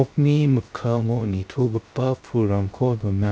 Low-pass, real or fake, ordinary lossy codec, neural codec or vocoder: none; fake; none; codec, 16 kHz, 0.3 kbps, FocalCodec